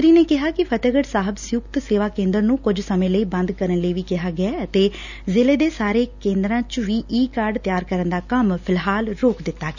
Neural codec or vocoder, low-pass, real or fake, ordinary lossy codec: none; 7.2 kHz; real; none